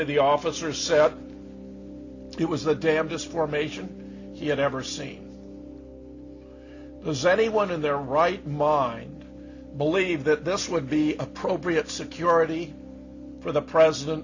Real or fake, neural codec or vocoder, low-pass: real; none; 7.2 kHz